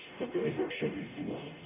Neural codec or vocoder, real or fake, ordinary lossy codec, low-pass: codec, 44.1 kHz, 0.9 kbps, DAC; fake; none; 3.6 kHz